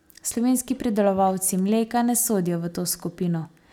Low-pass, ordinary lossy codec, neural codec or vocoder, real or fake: none; none; none; real